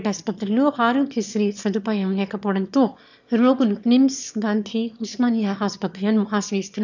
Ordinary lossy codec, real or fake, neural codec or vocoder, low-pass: none; fake; autoencoder, 22.05 kHz, a latent of 192 numbers a frame, VITS, trained on one speaker; 7.2 kHz